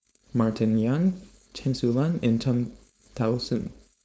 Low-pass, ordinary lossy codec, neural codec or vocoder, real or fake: none; none; codec, 16 kHz, 4.8 kbps, FACodec; fake